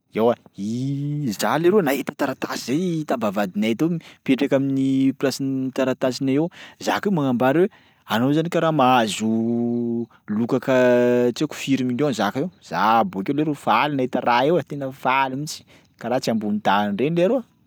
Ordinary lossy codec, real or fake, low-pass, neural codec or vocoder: none; real; none; none